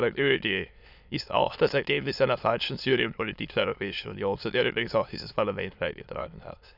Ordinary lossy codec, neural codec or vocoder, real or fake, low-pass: none; autoencoder, 22.05 kHz, a latent of 192 numbers a frame, VITS, trained on many speakers; fake; 5.4 kHz